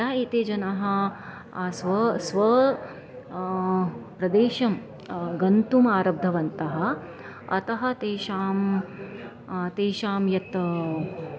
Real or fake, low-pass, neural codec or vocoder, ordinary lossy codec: real; none; none; none